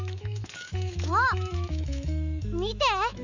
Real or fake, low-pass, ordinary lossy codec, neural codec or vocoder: fake; 7.2 kHz; none; autoencoder, 48 kHz, 128 numbers a frame, DAC-VAE, trained on Japanese speech